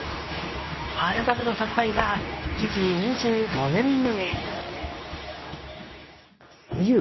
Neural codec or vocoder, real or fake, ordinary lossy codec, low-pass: codec, 24 kHz, 0.9 kbps, WavTokenizer, medium speech release version 2; fake; MP3, 24 kbps; 7.2 kHz